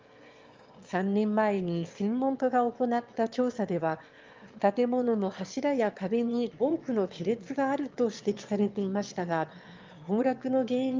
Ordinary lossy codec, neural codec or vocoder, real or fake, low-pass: Opus, 32 kbps; autoencoder, 22.05 kHz, a latent of 192 numbers a frame, VITS, trained on one speaker; fake; 7.2 kHz